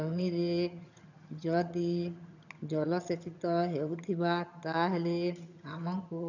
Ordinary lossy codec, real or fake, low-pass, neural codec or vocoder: none; fake; 7.2 kHz; vocoder, 22.05 kHz, 80 mel bands, HiFi-GAN